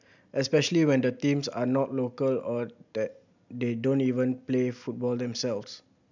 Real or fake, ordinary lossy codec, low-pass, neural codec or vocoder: real; none; 7.2 kHz; none